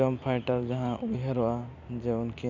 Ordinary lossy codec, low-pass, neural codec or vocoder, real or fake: none; 7.2 kHz; vocoder, 44.1 kHz, 128 mel bands every 512 samples, BigVGAN v2; fake